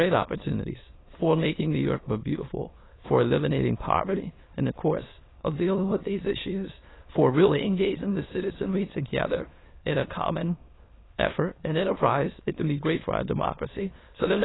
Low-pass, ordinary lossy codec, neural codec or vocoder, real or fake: 7.2 kHz; AAC, 16 kbps; autoencoder, 22.05 kHz, a latent of 192 numbers a frame, VITS, trained on many speakers; fake